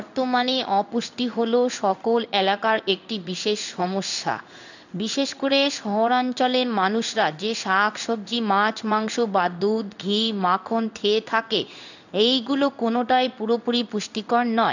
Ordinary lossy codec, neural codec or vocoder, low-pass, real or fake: none; codec, 16 kHz in and 24 kHz out, 1 kbps, XY-Tokenizer; 7.2 kHz; fake